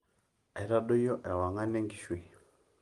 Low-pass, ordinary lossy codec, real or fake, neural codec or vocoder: 14.4 kHz; Opus, 32 kbps; real; none